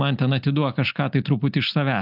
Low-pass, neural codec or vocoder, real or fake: 5.4 kHz; none; real